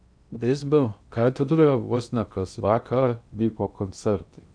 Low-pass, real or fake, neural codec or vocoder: 9.9 kHz; fake; codec, 16 kHz in and 24 kHz out, 0.6 kbps, FocalCodec, streaming, 2048 codes